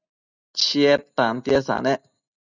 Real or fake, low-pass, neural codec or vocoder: real; 7.2 kHz; none